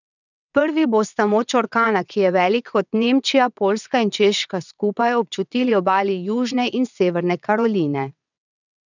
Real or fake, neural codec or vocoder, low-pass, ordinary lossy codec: fake; vocoder, 22.05 kHz, 80 mel bands, WaveNeXt; 7.2 kHz; none